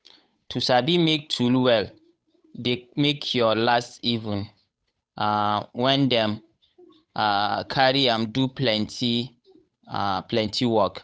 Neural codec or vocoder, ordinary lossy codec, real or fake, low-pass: codec, 16 kHz, 8 kbps, FunCodec, trained on Chinese and English, 25 frames a second; none; fake; none